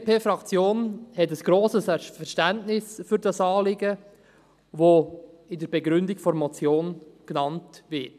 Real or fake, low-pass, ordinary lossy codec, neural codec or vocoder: real; 14.4 kHz; none; none